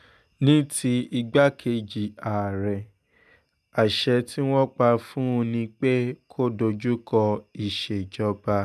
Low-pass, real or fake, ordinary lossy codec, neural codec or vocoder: 14.4 kHz; real; none; none